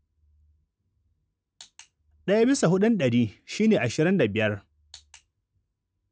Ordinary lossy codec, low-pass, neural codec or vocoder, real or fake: none; none; none; real